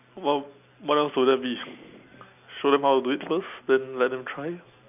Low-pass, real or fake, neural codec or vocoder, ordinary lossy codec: 3.6 kHz; real; none; none